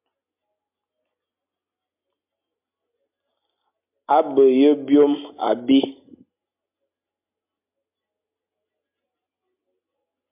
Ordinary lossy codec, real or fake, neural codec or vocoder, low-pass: MP3, 32 kbps; real; none; 3.6 kHz